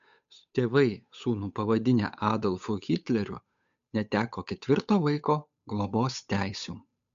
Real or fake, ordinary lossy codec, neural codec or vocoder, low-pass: fake; MP3, 64 kbps; codec, 16 kHz, 8 kbps, FunCodec, trained on Chinese and English, 25 frames a second; 7.2 kHz